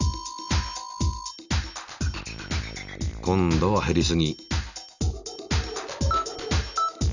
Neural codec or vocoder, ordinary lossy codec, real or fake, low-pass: none; none; real; 7.2 kHz